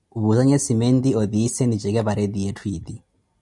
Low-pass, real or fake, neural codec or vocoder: 10.8 kHz; real; none